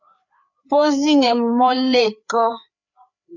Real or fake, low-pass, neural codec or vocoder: fake; 7.2 kHz; codec, 16 kHz, 4 kbps, FreqCodec, larger model